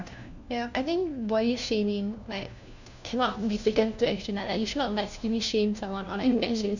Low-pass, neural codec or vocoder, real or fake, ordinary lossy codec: 7.2 kHz; codec, 16 kHz, 1 kbps, FunCodec, trained on LibriTTS, 50 frames a second; fake; none